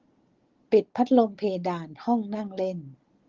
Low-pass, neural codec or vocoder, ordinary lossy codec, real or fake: 7.2 kHz; none; Opus, 16 kbps; real